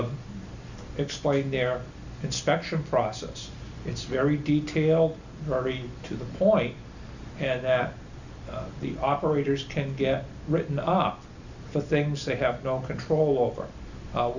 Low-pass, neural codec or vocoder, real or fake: 7.2 kHz; none; real